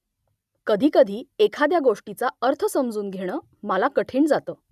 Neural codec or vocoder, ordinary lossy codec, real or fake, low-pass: none; none; real; 14.4 kHz